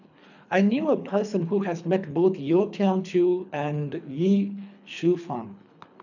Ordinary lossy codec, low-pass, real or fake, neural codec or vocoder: none; 7.2 kHz; fake; codec, 24 kHz, 3 kbps, HILCodec